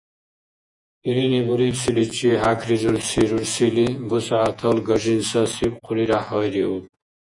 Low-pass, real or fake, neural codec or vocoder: 10.8 kHz; fake; vocoder, 48 kHz, 128 mel bands, Vocos